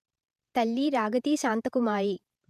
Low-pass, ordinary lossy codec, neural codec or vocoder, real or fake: 14.4 kHz; none; vocoder, 48 kHz, 128 mel bands, Vocos; fake